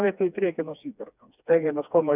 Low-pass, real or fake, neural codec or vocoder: 3.6 kHz; fake; codec, 16 kHz, 2 kbps, FreqCodec, smaller model